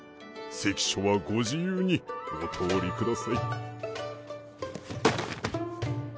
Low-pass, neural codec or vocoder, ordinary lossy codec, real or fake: none; none; none; real